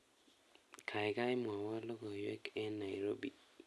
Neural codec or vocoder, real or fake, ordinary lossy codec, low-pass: none; real; none; none